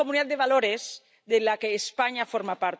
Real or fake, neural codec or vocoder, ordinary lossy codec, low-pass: real; none; none; none